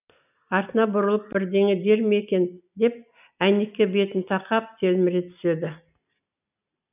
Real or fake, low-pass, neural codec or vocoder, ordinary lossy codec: real; 3.6 kHz; none; none